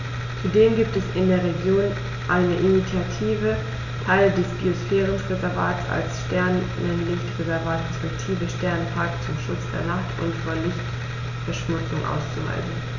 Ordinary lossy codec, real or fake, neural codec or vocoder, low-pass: none; real; none; 7.2 kHz